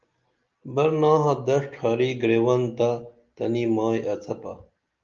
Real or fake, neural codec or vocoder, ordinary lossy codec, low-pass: real; none; Opus, 24 kbps; 7.2 kHz